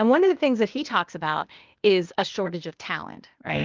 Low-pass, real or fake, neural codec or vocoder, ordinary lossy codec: 7.2 kHz; fake; codec, 16 kHz, 0.8 kbps, ZipCodec; Opus, 24 kbps